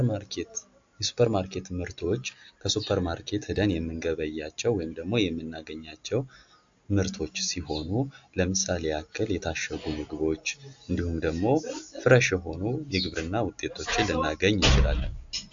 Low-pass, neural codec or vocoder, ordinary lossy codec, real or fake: 7.2 kHz; none; AAC, 64 kbps; real